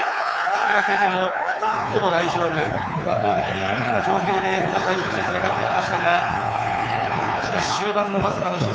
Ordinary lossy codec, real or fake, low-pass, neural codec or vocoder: none; fake; none; codec, 16 kHz, 4 kbps, X-Codec, WavLM features, trained on Multilingual LibriSpeech